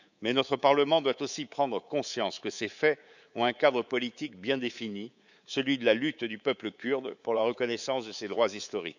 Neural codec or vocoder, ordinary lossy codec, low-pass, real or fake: codec, 24 kHz, 3.1 kbps, DualCodec; none; 7.2 kHz; fake